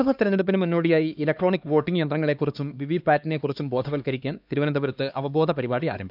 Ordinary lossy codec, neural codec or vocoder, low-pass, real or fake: none; codec, 16 kHz, 2 kbps, X-Codec, HuBERT features, trained on LibriSpeech; 5.4 kHz; fake